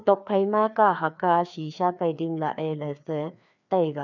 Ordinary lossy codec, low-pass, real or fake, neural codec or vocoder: none; 7.2 kHz; fake; codec, 16 kHz, 2 kbps, FreqCodec, larger model